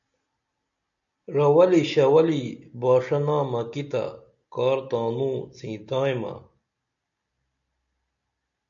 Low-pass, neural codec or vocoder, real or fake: 7.2 kHz; none; real